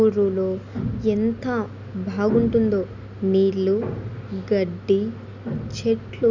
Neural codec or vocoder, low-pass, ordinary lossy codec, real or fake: none; 7.2 kHz; none; real